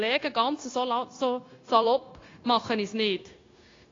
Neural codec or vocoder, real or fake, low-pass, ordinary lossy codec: codec, 16 kHz, 0.9 kbps, LongCat-Audio-Codec; fake; 7.2 kHz; AAC, 32 kbps